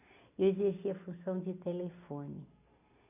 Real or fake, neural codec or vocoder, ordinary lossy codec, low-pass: real; none; AAC, 32 kbps; 3.6 kHz